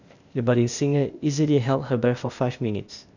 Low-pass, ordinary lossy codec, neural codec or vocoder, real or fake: 7.2 kHz; none; codec, 16 kHz, 0.8 kbps, ZipCodec; fake